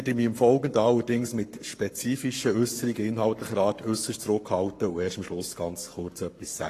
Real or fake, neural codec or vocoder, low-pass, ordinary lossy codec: fake; codec, 44.1 kHz, 7.8 kbps, Pupu-Codec; 14.4 kHz; AAC, 48 kbps